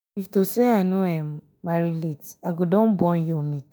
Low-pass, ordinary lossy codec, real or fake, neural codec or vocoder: none; none; fake; autoencoder, 48 kHz, 32 numbers a frame, DAC-VAE, trained on Japanese speech